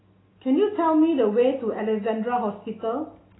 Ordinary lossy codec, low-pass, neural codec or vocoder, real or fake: AAC, 16 kbps; 7.2 kHz; none; real